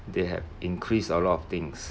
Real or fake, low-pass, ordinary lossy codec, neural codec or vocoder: real; none; none; none